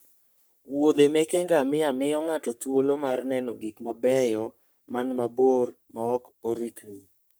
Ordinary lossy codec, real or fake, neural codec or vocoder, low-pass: none; fake; codec, 44.1 kHz, 3.4 kbps, Pupu-Codec; none